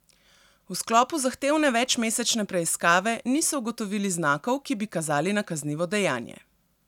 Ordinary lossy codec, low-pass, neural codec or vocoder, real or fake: none; 19.8 kHz; none; real